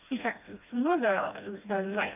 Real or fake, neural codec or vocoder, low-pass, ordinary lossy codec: fake; codec, 16 kHz, 1 kbps, FreqCodec, smaller model; 3.6 kHz; none